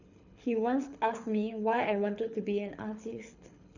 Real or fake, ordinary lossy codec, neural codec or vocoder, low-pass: fake; none; codec, 24 kHz, 6 kbps, HILCodec; 7.2 kHz